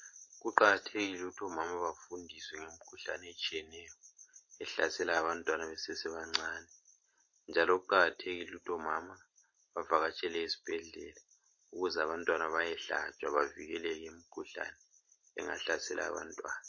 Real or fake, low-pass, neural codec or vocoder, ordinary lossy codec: real; 7.2 kHz; none; MP3, 32 kbps